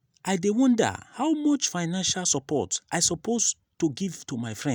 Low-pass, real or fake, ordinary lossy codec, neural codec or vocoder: none; real; none; none